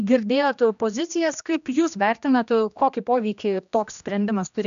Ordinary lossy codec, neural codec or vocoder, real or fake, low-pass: AAC, 96 kbps; codec, 16 kHz, 1 kbps, X-Codec, HuBERT features, trained on general audio; fake; 7.2 kHz